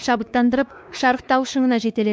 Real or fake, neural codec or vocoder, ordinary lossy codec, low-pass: fake; codec, 16 kHz, 2 kbps, X-Codec, WavLM features, trained on Multilingual LibriSpeech; none; none